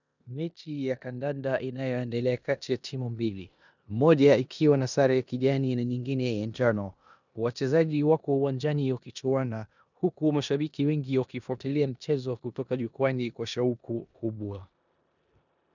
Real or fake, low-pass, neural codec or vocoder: fake; 7.2 kHz; codec, 16 kHz in and 24 kHz out, 0.9 kbps, LongCat-Audio-Codec, four codebook decoder